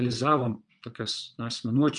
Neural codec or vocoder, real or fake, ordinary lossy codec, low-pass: vocoder, 22.05 kHz, 80 mel bands, WaveNeXt; fake; MP3, 64 kbps; 9.9 kHz